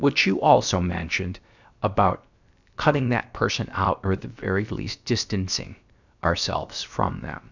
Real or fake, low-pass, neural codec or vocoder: fake; 7.2 kHz; codec, 16 kHz, about 1 kbps, DyCAST, with the encoder's durations